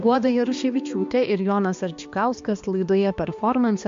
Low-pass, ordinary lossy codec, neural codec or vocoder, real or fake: 7.2 kHz; MP3, 48 kbps; codec, 16 kHz, 2 kbps, X-Codec, HuBERT features, trained on balanced general audio; fake